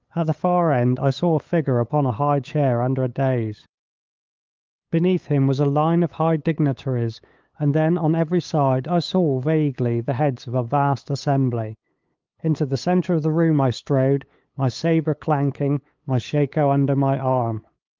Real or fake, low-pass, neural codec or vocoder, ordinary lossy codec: fake; 7.2 kHz; codec, 16 kHz, 8 kbps, FunCodec, trained on LibriTTS, 25 frames a second; Opus, 32 kbps